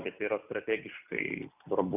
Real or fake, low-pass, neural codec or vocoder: real; 3.6 kHz; none